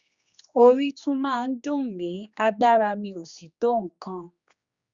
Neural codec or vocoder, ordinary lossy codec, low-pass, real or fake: codec, 16 kHz, 2 kbps, X-Codec, HuBERT features, trained on general audio; Opus, 64 kbps; 7.2 kHz; fake